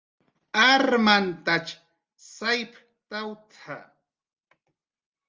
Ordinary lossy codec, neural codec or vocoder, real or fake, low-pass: Opus, 32 kbps; none; real; 7.2 kHz